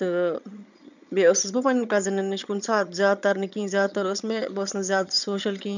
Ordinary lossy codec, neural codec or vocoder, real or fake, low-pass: none; vocoder, 22.05 kHz, 80 mel bands, HiFi-GAN; fake; 7.2 kHz